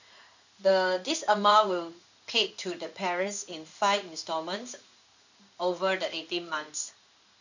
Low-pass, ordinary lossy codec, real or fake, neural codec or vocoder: 7.2 kHz; none; fake; codec, 16 kHz in and 24 kHz out, 1 kbps, XY-Tokenizer